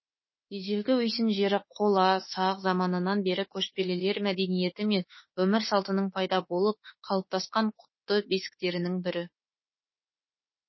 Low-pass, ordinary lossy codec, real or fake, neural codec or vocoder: 7.2 kHz; MP3, 24 kbps; fake; autoencoder, 48 kHz, 32 numbers a frame, DAC-VAE, trained on Japanese speech